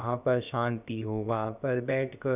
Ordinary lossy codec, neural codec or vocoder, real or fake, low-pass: none; codec, 16 kHz, about 1 kbps, DyCAST, with the encoder's durations; fake; 3.6 kHz